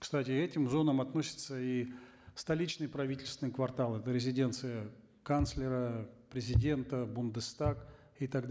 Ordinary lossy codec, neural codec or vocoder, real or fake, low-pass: none; none; real; none